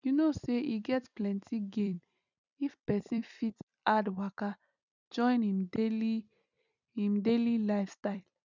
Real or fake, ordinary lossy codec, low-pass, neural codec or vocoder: fake; none; 7.2 kHz; vocoder, 44.1 kHz, 128 mel bands every 256 samples, BigVGAN v2